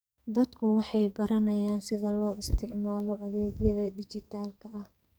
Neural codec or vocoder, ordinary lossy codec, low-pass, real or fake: codec, 44.1 kHz, 2.6 kbps, SNAC; none; none; fake